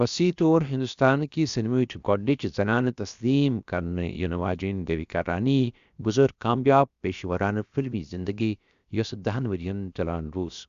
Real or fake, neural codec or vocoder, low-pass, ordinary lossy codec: fake; codec, 16 kHz, about 1 kbps, DyCAST, with the encoder's durations; 7.2 kHz; Opus, 64 kbps